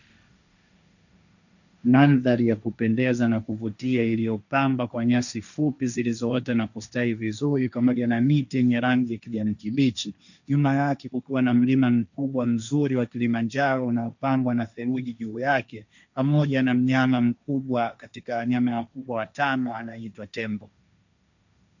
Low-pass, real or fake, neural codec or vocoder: 7.2 kHz; fake; codec, 16 kHz, 1.1 kbps, Voila-Tokenizer